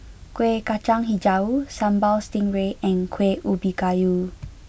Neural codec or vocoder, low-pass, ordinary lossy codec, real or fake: none; none; none; real